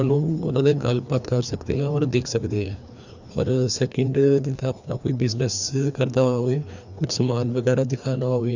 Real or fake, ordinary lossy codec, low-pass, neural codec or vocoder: fake; none; 7.2 kHz; codec, 16 kHz, 2 kbps, FreqCodec, larger model